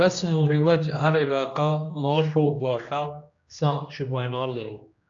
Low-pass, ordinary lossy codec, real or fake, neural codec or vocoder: 7.2 kHz; AAC, 64 kbps; fake; codec, 16 kHz, 1 kbps, X-Codec, HuBERT features, trained on general audio